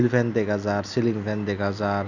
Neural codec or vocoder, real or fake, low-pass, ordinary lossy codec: none; real; 7.2 kHz; none